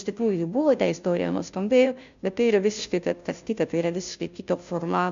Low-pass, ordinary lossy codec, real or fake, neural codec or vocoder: 7.2 kHz; MP3, 64 kbps; fake; codec, 16 kHz, 0.5 kbps, FunCodec, trained on Chinese and English, 25 frames a second